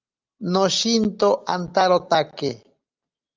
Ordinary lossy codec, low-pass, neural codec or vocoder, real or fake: Opus, 24 kbps; 7.2 kHz; none; real